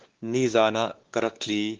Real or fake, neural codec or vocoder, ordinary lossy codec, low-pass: fake; codec, 16 kHz, 2 kbps, X-Codec, WavLM features, trained on Multilingual LibriSpeech; Opus, 16 kbps; 7.2 kHz